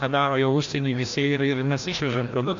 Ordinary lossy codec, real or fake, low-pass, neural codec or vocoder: AAC, 64 kbps; fake; 7.2 kHz; codec, 16 kHz, 1 kbps, FreqCodec, larger model